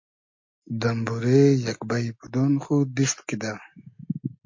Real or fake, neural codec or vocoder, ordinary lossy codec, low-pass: real; none; MP3, 48 kbps; 7.2 kHz